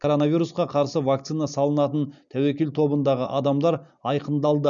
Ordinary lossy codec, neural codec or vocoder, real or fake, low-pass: none; none; real; 7.2 kHz